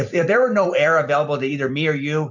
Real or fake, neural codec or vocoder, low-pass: fake; vocoder, 44.1 kHz, 128 mel bands every 256 samples, BigVGAN v2; 7.2 kHz